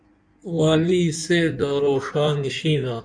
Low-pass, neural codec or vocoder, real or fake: 9.9 kHz; codec, 16 kHz in and 24 kHz out, 1.1 kbps, FireRedTTS-2 codec; fake